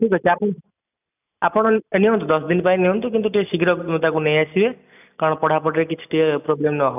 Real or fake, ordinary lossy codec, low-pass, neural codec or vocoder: real; none; 3.6 kHz; none